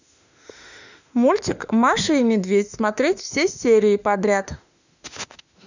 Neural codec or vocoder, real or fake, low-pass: autoencoder, 48 kHz, 32 numbers a frame, DAC-VAE, trained on Japanese speech; fake; 7.2 kHz